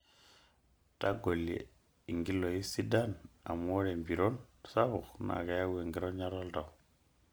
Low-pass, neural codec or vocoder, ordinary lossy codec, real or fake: none; none; none; real